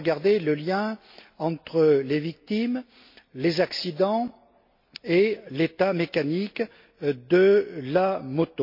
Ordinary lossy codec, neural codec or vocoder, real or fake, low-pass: MP3, 32 kbps; none; real; 5.4 kHz